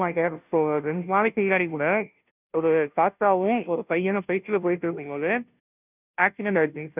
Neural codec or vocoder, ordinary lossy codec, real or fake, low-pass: codec, 16 kHz, 0.5 kbps, FunCodec, trained on Chinese and English, 25 frames a second; none; fake; 3.6 kHz